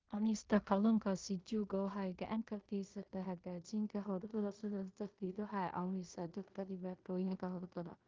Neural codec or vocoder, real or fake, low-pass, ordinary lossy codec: codec, 16 kHz in and 24 kHz out, 0.4 kbps, LongCat-Audio-Codec, two codebook decoder; fake; 7.2 kHz; Opus, 32 kbps